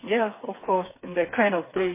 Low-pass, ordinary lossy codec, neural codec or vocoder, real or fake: 3.6 kHz; MP3, 16 kbps; codec, 16 kHz, 4 kbps, FreqCodec, smaller model; fake